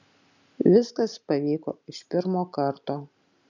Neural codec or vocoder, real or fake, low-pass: none; real; 7.2 kHz